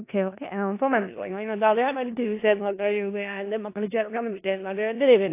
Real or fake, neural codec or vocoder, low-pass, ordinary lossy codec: fake; codec, 16 kHz in and 24 kHz out, 0.4 kbps, LongCat-Audio-Codec, four codebook decoder; 3.6 kHz; AAC, 24 kbps